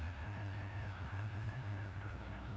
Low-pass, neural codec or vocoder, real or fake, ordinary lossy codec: none; codec, 16 kHz, 0.5 kbps, FunCodec, trained on LibriTTS, 25 frames a second; fake; none